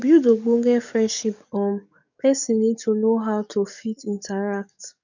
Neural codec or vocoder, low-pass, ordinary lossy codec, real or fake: codec, 44.1 kHz, 7.8 kbps, DAC; 7.2 kHz; none; fake